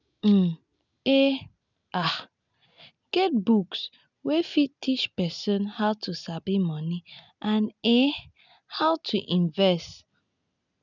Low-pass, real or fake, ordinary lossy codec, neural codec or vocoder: 7.2 kHz; real; none; none